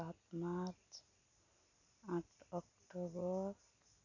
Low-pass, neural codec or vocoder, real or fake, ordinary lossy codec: 7.2 kHz; none; real; none